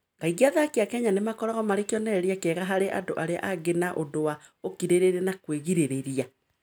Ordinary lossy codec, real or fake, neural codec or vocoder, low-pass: none; real; none; none